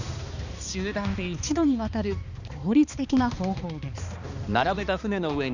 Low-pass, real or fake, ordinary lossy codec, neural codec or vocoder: 7.2 kHz; fake; none; codec, 16 kHz, 2 kbps, X-Codec, HuBERT features, trained on balanced general audio